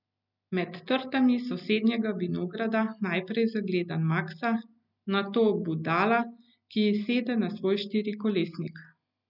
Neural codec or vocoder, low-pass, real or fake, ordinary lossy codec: none; 5.4 kHz; real; none